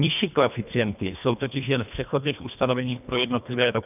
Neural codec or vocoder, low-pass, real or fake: codec, 24 kHz, 1.5 kbps, HILCodec; 3.6 kHz; fake